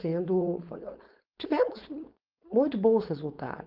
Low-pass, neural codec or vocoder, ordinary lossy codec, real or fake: 5.4 kHz; codec, 16 kHz, 4.8 kbps, FACodec; Opus, 24 kbps; fake